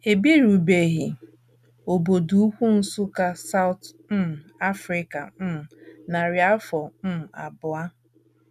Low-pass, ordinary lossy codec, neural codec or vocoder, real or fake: 14.4 kHz; none; none; real